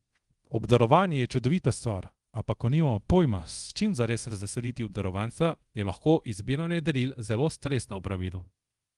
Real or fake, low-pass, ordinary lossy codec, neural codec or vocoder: fake; 10.8 kHz; Opus, 24 kbps; codec, 24 kHz, 0.5 kbps, DualCodec